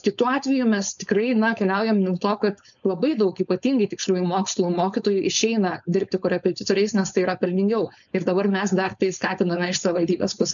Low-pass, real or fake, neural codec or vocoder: 7.2 kHz; fake; codec, 16 kHz, 4.8 kbps, FACodec